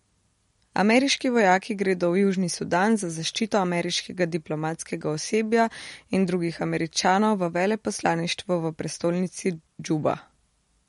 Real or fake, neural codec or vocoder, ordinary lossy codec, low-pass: real; none; MP3, 48 kbps; 19.8 kHz